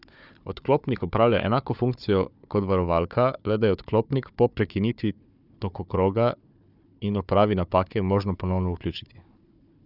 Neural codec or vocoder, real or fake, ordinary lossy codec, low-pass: codec, 16 kHz, 4 kbps, FunCodec, trained on Chinese and English, 50 frames a second; fake; none; 5.4 kHz